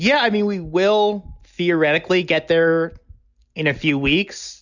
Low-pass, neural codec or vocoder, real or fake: 7.2 kHz; none; real